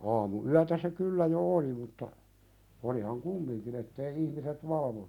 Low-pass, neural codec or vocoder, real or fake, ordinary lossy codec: 19.8 kHz; none; real; none